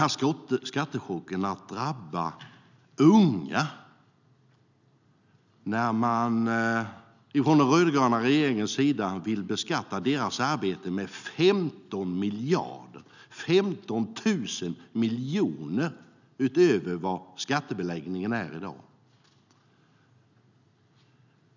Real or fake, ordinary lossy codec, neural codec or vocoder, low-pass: real; none; none; 7.2 kHz